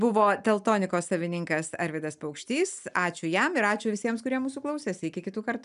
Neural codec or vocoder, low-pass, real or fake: none; 10.8 kHz; real